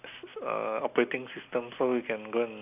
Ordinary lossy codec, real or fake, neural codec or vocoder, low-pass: none; real; none; 3.6 kHz